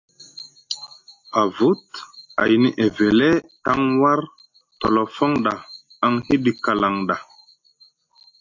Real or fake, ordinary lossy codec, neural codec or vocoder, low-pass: real; AAC, 48 kbps; none; 7.2 kHz